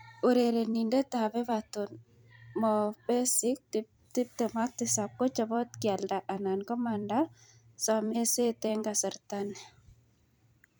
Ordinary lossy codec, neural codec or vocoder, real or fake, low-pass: none; vocoder, 44.1 kHz, 128 mel bands every 256 samples, BigVGAN v2; fake; none